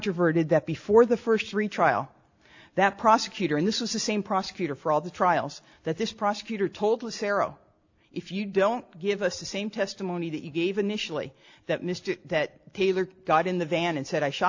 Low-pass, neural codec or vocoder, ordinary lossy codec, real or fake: 7.2 kHz; none; AAC, 48 kbps; real